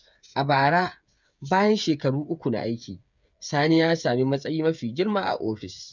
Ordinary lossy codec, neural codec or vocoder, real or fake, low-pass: none; codec, 16 kHz, 8 kbps, FreqCodec, smaller model; fake; 7.2 kHz